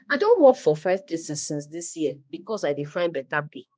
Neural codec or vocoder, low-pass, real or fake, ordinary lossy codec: codec, 16 kHz, 1 kbps, X-Codec, HuBERT features, trained on balanced general audio; none; fake; none